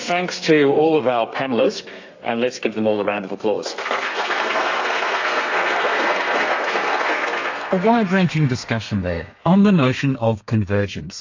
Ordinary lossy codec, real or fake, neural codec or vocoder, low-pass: AAC, 48 kbps; fake; codec, 32 kHz, 1.9 kbps, SNAC; 7.2 kHz